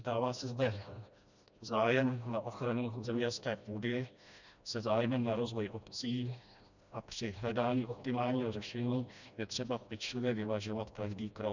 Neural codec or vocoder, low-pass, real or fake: codec, 16 kHz, 1 kbps, FreqCodec, smaller model; 7.2 kHz; fake